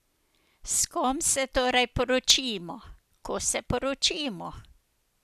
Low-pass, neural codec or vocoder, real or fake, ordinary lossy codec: 14.4 kHz; none; real; none